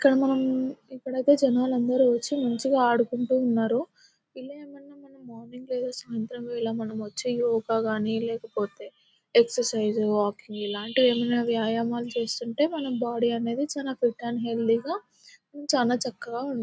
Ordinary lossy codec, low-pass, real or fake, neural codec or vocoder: none; none; real; none